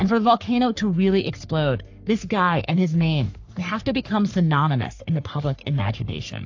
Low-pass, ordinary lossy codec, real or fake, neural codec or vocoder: 7.2 kHz; AAC, 48 kbps; fake; codec, 44.1 kHz, 3.4 kbps, Pupu-Codec